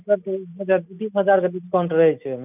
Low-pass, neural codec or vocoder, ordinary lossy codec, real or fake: 3.6 kHz; none; none; real